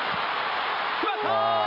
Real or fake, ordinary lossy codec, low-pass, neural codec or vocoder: real; none; 5.4 kHz; none